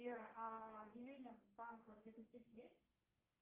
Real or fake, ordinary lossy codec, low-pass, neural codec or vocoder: fake; Opus, 32 kbps; 3.6 kHz; codec, 44.1 kHz, 1.7 kbps, Pupu-Codec